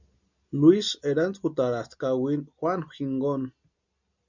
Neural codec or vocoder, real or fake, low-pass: none; real; 7.2 kHz